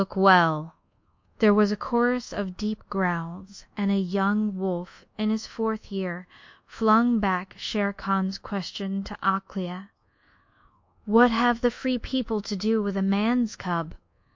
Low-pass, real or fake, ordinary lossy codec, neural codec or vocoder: 7.2 kHz; fake; MP3, 48 kbps; codec, 24 kHz, 1.2 kbps, DualCodec